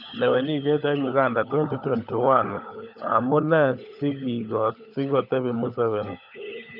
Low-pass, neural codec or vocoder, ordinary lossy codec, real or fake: 5.4 kHz; codec, 16 kHz, 16 kbps, FunCodec, trained on LibriTTS, 50 frames a second; none; fake